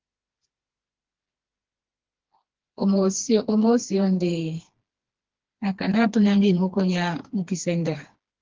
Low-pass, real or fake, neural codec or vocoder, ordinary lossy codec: 7.2 kHz; fake; codec, 16 kHz, 2 kbps, FreqCodec, smaller model; Opus, 16 kbps